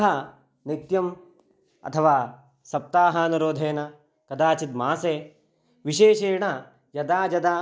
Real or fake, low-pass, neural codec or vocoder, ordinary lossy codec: real; none; none; none